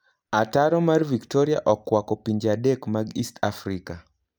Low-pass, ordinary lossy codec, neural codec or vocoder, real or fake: none; none; none; real